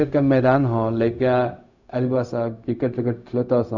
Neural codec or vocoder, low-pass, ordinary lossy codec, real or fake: codec, 16 kHz, 0.4 kbps, LongCat-Audio-Codec; 7.2 kHz; none; fake